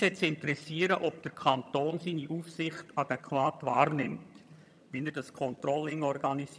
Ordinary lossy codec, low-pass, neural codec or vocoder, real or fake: none; none; vocoder, 22.05 kHz, 80 mel bands, HiFi-GAN; fake